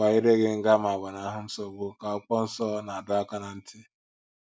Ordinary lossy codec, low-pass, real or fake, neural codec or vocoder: none; none; real; none